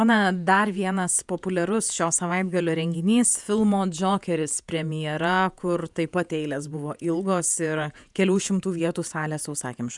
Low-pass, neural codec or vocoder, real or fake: 10.8 kHz; vocoder, 48 kHz, 128 mel bands, Vocos; fake